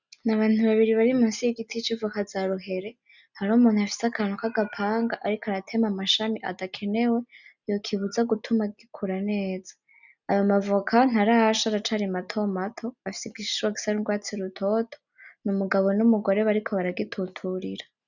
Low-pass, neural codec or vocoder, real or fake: 7.2 kHz; none; real